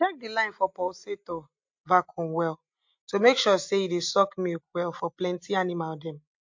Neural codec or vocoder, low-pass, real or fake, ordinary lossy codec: none; 7.2 kHz; real; MP3, 48 kbps